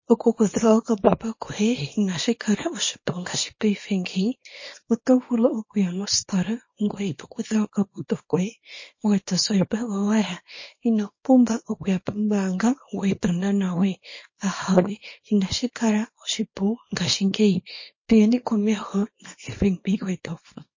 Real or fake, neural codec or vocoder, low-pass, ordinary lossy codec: fake; codec, 24 kHz, 0.9 kbps, WavTokenizer, small release; 7.2 kHz; MP3, 32 kbps